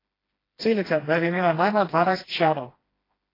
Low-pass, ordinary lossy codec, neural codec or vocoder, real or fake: 5.4 kHz; AAC, 24 kbps; codec, 16 kHz, 1 kbps, FreqCodec, smaller model; fake